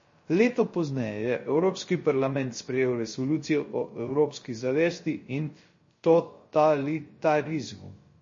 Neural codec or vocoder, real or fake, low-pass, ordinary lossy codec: codec, 16 kHz, 0.3 kbps, FocalCodec; fake; 7.2 kHz; MP3, 32 kbps